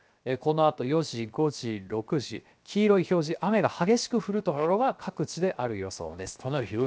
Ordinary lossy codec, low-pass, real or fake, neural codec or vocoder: none; none; fake; codec, 16 kHz, 0.7 kbps, FocalCodec